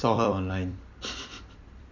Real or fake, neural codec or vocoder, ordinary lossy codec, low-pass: fake; vocoder, 44.1 kHz, 128 mel bands every 256 samples, BigVGAN v2; none; 7.2 kHz